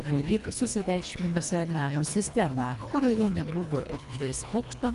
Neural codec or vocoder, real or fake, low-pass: codec, 24 kHz, 1.5 kbps, HILCodec; fake; 10.8 kHz